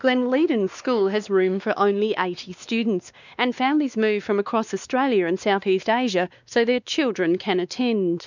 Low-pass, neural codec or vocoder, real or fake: 7.2 kHz; codec, 16 kHz, 2 kbps, X-Codec, WavLM features, trained on Multilingual LibriSpeech; fake